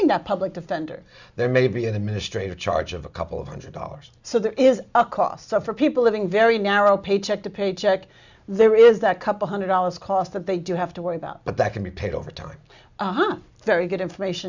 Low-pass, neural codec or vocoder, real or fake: 7.2 kHz; none; real